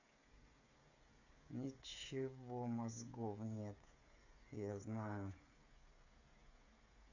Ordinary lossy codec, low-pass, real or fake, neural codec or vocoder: none; 7.2 kHz; fake; codec, 16 kHz, 8 kbps, FreqCodec, smaller model